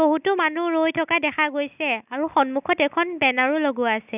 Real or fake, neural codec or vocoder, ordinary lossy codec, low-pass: real; none; none; 3.6 kHz